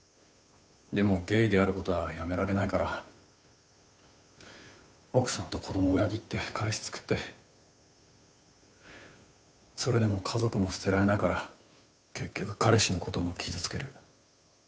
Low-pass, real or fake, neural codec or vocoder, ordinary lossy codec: none; fake; codec, 16 kHz, 2 kbps, FunCodec, trained on Chinese and English, 25 frames a second; none